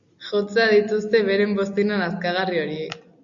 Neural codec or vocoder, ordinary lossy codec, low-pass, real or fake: none; AAC, 64 kbps; 7.2 kHz; real